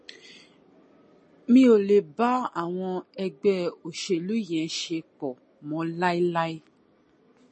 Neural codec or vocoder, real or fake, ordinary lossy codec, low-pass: none; real; MP3, 32 kbps; 9.9 kHz